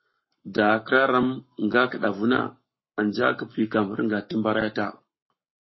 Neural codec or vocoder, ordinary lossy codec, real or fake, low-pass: codec, 44.1 kHz, 7.8 kbps, Pupu-Codec; MP3, 24 kbps; fake; 7.2 kHz